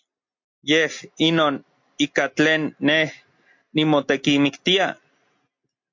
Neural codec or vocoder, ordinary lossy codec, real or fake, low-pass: none; MP3, 48 kbps; real; 7.2 kHz